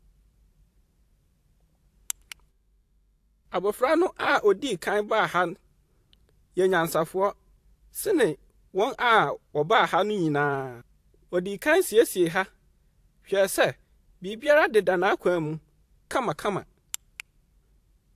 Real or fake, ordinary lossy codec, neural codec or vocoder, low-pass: fake; AAC, 64 kbps; vocoder, 44.1 kHz, 128 mel bands every 512 samples, BigVGAN v2; 14.4 kHz